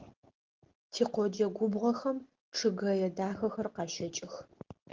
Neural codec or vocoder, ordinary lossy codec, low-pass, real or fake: none; Opus, 16 kbps; 7.2 kHz; real